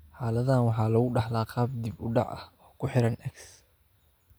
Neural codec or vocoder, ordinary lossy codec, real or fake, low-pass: none; none; real; none